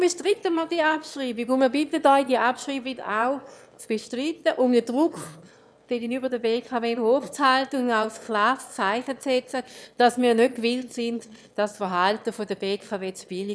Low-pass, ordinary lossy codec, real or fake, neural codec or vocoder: none; none; fake; autoencoder, 22.05 kHz, a latent of 192 numbers a frame, VITS, trained on one speaker